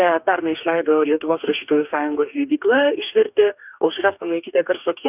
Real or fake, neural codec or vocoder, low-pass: fake; codec, 44.1 kHz, 2.6 kbps, DAC; 3.6 kHz